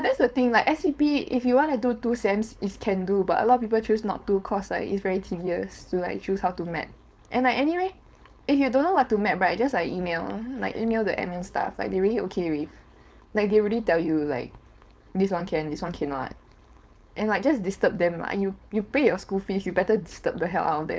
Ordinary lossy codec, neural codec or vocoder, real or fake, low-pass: none; codec, 16 kHz, 4.8 kbps, FACodec; fake; none